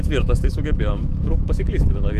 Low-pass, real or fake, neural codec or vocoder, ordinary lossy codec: 14.4 kHz; fake; autoencoder, 48 kHz, 128 numbers a frame, DAC-VAE, trained on Japanese speech; Opus, 64 kbps